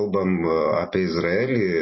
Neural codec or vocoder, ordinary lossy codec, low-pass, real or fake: none; MP3, 24 kbps; 7.2 kHz; real